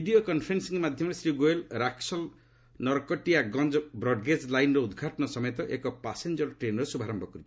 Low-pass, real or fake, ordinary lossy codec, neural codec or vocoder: none; real; none; none